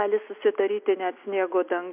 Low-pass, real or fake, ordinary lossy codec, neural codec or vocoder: 3.6 kHz; real; MP3, 32 kbps; none